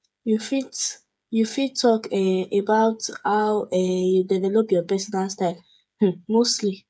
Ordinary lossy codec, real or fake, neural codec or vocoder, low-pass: none; fake; codec, 16 kHz, 8 kbps, FreqCodec, smaller model; none